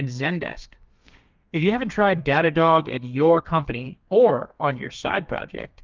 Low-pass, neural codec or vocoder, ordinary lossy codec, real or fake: 7.2 kHz; codec, 32 kHz, 1.9 kbps, SNAC; Opus, 32 kbps; fake